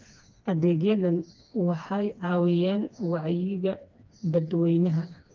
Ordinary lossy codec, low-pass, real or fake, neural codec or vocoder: Opus, 32 kbps; 7.2 kHz; fake; codec, 16 kHz, 2 kbps, FreqCodec, smaller model